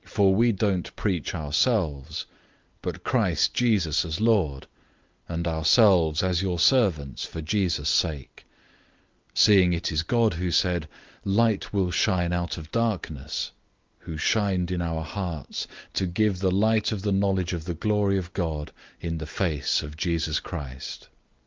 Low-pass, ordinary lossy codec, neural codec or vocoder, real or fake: 7.2 kHz; Opus, 32 kbps; none; real